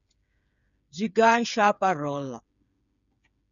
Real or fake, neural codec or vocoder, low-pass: fake; codec, 16 kHz, 8 kbps, FreqCodec, smaller model; 7.2 kHz